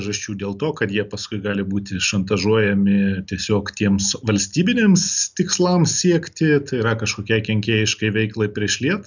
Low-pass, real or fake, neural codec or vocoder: 7.2 kHz; real; none